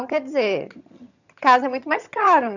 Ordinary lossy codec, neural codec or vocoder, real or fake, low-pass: none; vocoder, 22.05 kHz, 80 mel bands, HiFi-GAN; fake; 7.2 kHz